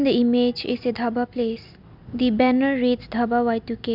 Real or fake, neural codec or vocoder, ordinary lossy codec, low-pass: real; none; none; 5.4 kHz